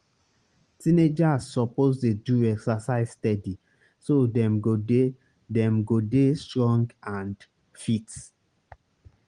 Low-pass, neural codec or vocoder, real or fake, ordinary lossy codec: 9.9 kHz; none; real; Opus, 24 kbps